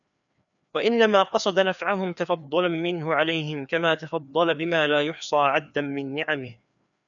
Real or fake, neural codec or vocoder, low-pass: fake; codec, 16 kHz, 2 kbps, FreqCodec, larger model; 7.2 kHz